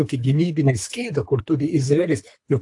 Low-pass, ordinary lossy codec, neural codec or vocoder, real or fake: 10.8 kHz; AAC, 64 kbps; codec, 24 kHz, 3 kbps, HILCodec; fake